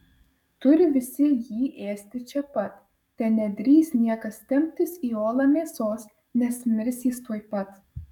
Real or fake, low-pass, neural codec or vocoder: fake; 19.8 kHz; codec, 44.1 kHz, 7.8 kbps, DAC